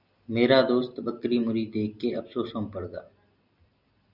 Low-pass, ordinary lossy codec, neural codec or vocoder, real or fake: 5.4 kHz; Opus, 64 kbps; none; real